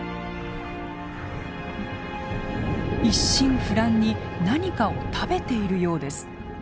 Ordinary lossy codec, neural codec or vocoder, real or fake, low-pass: none; none; real; none